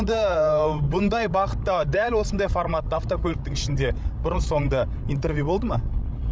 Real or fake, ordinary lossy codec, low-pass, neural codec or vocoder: fake; none; none; codec, 16 kHz, 16 kbps, FreqCodec, larger model